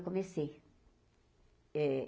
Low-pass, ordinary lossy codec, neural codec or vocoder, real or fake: none; none; none; real